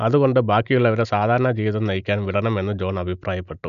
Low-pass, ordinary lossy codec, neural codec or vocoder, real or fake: 7.2 kHz; none; none; real